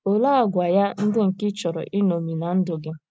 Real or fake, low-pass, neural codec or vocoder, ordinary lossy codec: real; none; none; none